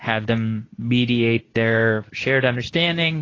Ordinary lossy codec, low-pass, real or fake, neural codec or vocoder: AAC, 32 kbps; 7.2 kHz; fake; codec, 24 kHz, 0.9 kbps, WavTokenizer, medium speech release version 2